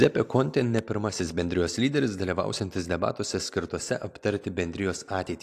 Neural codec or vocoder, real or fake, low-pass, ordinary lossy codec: none; real; 14.4 kHz; AAC, 64 kbps